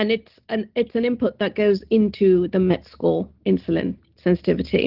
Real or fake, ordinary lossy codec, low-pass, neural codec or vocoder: real; Opus, 16 kbps; 5.4 kHz; none